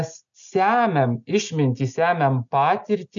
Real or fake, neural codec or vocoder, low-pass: real; none; 7.2 kHz